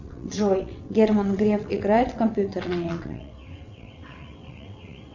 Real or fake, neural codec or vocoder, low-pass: fake; vocoder, 22.05 kHz, 80 mel bands, WaveNeXt; 7.2 kHz